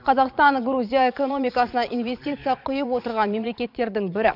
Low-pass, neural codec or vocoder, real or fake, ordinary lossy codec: 5.4 kHz; vocoder, 22.05 kHz, 80 mel bands, Vocos; fake; none